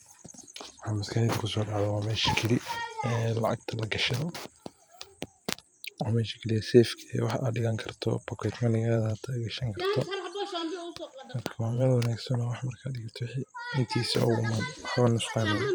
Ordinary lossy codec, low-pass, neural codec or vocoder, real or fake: none; none; vocoder, 44.1 kHz, 128 mel bands every 512 samples, BigVGAN v2; fake